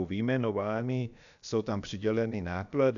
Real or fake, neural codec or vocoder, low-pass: fake; codec, 16 kHz, about 1 kbps, DyCAST, with the encoder's durations; 7.2 kHz